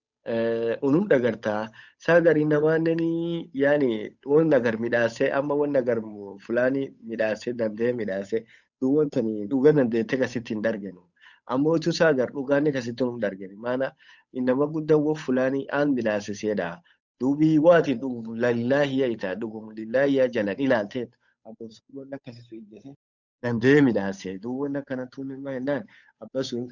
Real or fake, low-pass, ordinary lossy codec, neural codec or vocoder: fake; 7.2 kHz; none; codec, 16 kHz, 8 kbps, FunCodec, trained on Chinese and English, 25 frames a second